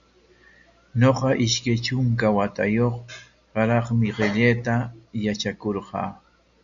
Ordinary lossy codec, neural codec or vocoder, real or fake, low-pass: MP3, 96 kbps; none; real; 7.2 kHz